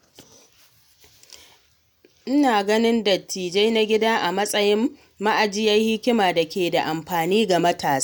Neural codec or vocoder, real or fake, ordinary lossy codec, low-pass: none; real; none; none